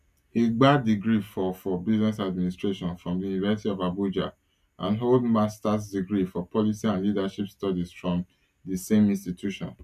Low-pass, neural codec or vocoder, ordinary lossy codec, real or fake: 14.4 kHz; none; none; real